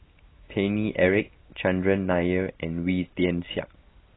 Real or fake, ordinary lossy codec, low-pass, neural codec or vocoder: real; AAC, 16 kbps; 7.2 kHz; none